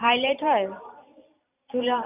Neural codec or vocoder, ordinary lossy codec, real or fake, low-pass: vocoder, 44.1 kHz, 128 mel bands every 512 samples, BigVGAN v2; none; fake; 3.6 kHz